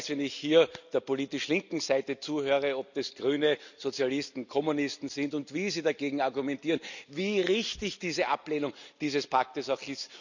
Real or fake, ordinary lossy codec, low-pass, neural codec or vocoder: real; none; 7.2 kHz; none